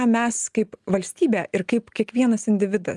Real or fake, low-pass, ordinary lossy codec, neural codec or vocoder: real; 10.8 kHz; Opus, 32 kbps; none